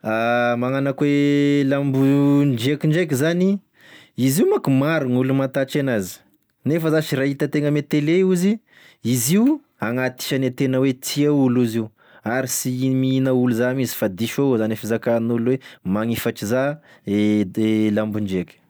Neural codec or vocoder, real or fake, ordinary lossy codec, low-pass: none; real; none; none